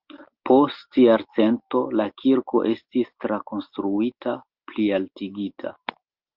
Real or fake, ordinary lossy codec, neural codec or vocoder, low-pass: real; Opus, 24 kbps; none; 5.4 kHz